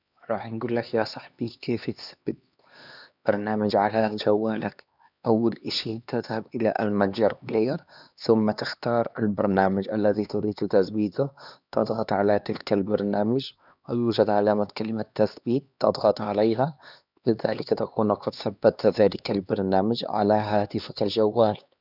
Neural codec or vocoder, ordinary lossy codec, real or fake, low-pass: codec, 16 kHz, 2 kbps, X-Codec, HuBERT features, trained on LibriSpeech; none; fake; 5.4 kHz